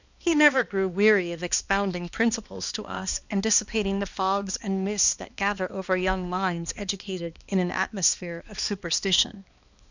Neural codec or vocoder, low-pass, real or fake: codec, 16 kHz, 2 kbps, X-Codec, HuBERT features, trained on balanced general audio; 7.2 kHz; fake